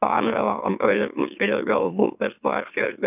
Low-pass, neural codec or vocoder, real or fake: 3.6 kHz; autoencoder, 44.1 kHz, a latent of 192 numbers a frame, MeloTTS; fake